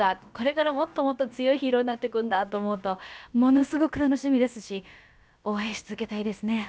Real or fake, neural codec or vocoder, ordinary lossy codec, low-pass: fake; codec, 16 kHz, about 1 kbps, DyCAST, with the encoder's durations; none; none